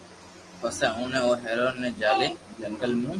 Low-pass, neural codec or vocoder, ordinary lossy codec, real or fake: 10.8 kHz; none; Opus, 24 kbps; real